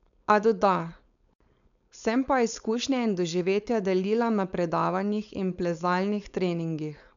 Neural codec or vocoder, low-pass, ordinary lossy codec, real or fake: codec, 16 kHz, 4.8 kbps, FACodec; 7.2 kHz; none; fake